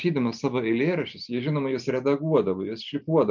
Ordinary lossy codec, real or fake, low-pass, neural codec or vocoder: MP3, 64 kbps; real; 7.2 kHz; none